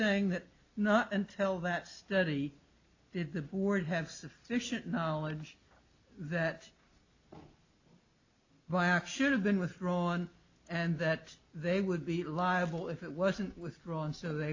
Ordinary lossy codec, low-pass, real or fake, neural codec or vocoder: AAC, 48 kbps; 7.2 kHz; real; none